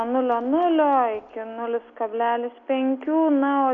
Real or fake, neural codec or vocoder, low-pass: real; none; 7.2 kHz